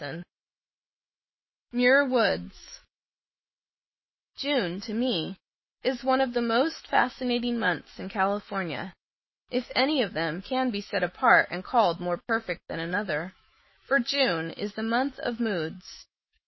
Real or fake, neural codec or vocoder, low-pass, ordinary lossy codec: fake; autoencoder, 48 kHz, 128 numbers a frame, DAC-VAE, trained on Japanese speech; 7.2 kHz; MP3, 24 kbps